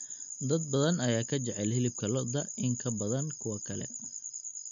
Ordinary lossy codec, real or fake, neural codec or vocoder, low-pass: MP3, 64 kbps; real; none; 7.2 kHz